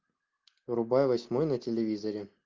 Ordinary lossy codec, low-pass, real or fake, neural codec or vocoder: Opus, 24 kbps; 7.2 kHz; real; none